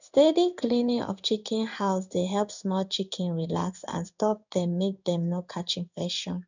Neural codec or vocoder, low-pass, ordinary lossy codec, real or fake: codec, 16 kHz in and 24 kHz out, 1 kbps, XY-Tokenizer; 7.2 kHz; none; fake